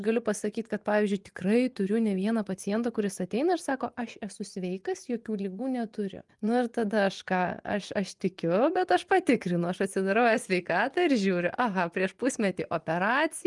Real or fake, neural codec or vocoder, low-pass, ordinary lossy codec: fake; vocoder, 24 kHz, 100 mel bands, Vocos; 10.8 kHz; Opus, 32 kbps